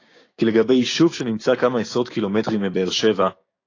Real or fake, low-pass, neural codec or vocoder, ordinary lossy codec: fake; 7.2 kHz; codec, 16 kHz, 6 kbps, DAC; AAC, 32 kbps